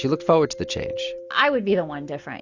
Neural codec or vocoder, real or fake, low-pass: none; real; 7.2 kHz